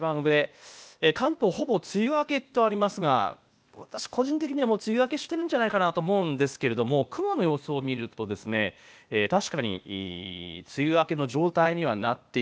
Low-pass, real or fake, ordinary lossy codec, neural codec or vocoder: none; fake; none; codec, 16 kHz, 0.8 kbps, ZipCodec